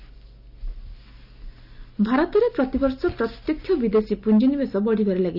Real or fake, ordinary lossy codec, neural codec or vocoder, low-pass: real; none; none; 5.4 kHz